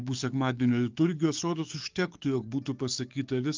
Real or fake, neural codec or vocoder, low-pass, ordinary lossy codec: fake; codec, 44.1 kHz, 7.8 kbps, DAC; 7.2 kHz; Opus, 24 kbps